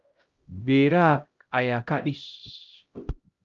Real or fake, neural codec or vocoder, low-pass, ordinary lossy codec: fake; codec, 16 kHz, 0.5 kbps, X-Codec, HuBERT features, trained on LibriSpeech; 7.2 kHz; Opus, 32 kbps